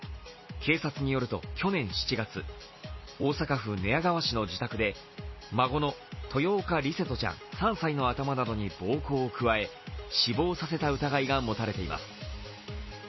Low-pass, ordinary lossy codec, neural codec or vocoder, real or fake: 7.2 kHz; MP3, 24 kbps; none; real